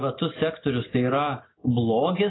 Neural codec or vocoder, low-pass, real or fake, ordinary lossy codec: none; 7.2 kHz; real; AAC, 16 kbps